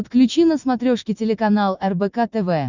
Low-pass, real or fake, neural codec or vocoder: 7.2 kHz; real; none